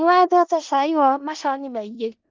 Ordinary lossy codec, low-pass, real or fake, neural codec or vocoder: Opus, 32 kbps; 7.2 kHz; fake; codec, 16 kHz in and 24 kHz out, 0.4 kbps, LongCat-Audio-Codec, four codebook decoder